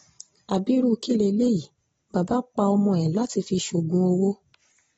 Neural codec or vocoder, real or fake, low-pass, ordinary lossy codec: none; real; 19.8 kHz; AAC, 24 kbps